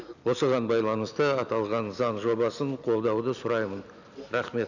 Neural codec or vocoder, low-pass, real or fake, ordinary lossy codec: none; 7.2 kHz; real; none